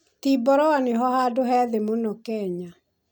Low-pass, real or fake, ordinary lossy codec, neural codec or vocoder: none; real; none; none